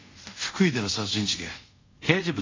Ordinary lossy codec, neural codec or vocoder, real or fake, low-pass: AAC, 32 kbps; codec, 24 kHz, 0.5 kbps, DualCodec; fake; 7.2 kHz